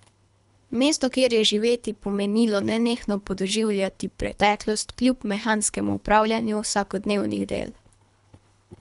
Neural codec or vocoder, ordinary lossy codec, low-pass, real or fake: codec, 24 kHz, 3 kbps, HILCodec; none; 10.8 kHz; fake